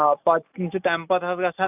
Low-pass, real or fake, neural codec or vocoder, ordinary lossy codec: 3.6 kHz; real; none; none